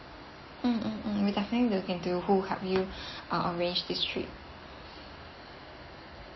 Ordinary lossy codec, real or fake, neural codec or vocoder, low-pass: MP3, 24 kbps; real; none; 7.2 kHz